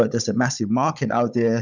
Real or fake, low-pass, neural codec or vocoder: fake; 7.2 kHz; codec, 16 kHz, 16 kbps, FunCodec, trained on Chinese and English, 50 frames a second